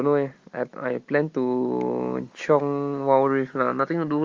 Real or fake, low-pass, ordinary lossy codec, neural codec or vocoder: real; 7.2 kHz; Opus, 32 kbps; none